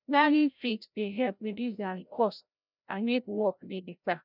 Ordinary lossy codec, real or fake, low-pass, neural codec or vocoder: none; fake; 5.4 kHz; codec, 16 kHz, 0.5 kbps, FreqCodec, larger model